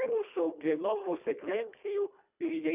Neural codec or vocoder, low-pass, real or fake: codec, 24 kHz, 1.5 kbps, HILCodec; 3.6 kHz; fake